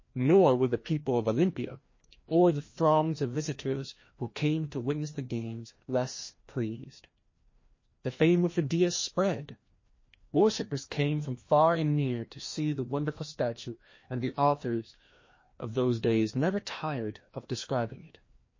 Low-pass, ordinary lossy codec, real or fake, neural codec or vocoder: 7.2 kHz; MP3, 32 kbps; fake; codec, 16 kHz, 1 kbps, FreqCodec, larger model